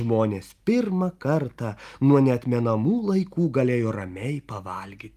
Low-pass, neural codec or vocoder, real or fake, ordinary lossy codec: 14.4 kHz; none; real; Opus, 32 kbps